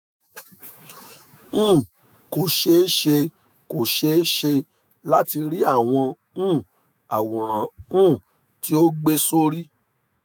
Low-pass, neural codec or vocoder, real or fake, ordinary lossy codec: none; autoencoder, 48 kHz, 128 numbers a frame, DAC-VAE, trained on Japanese speech; fake; none